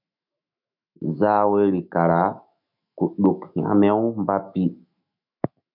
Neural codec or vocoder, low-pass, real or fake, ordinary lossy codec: autoencoder, 48 kHz, 128 numbers a frame, DAC-VAE, trained on Japanese speech; 5.4 kHz; fake; MP3, 48 kbps